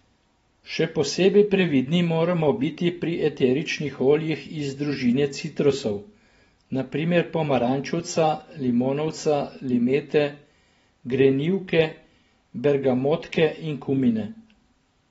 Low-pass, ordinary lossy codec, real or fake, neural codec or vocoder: 19.8 kHz; AAC, 24 kbps; fake; vocoder, 44.1 kHz, 128 mel bands every 512 samples, BigVGAN v2